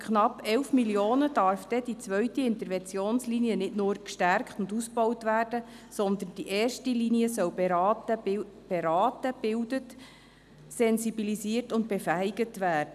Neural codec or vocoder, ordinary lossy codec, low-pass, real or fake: none; none; 14.4 kHz; real